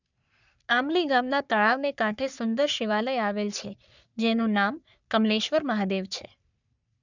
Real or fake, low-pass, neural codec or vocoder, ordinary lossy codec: fake; 7.2 kHz; codec, 44.1 kHz, 3.4 kbps, Pupu-Codec; none